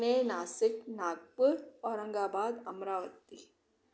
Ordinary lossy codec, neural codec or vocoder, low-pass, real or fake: none; none; none; real